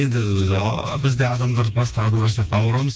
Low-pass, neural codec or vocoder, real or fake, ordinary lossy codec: none; codec, 16 kHz, 2 kbps, FreqCodec, smaller model; fake; none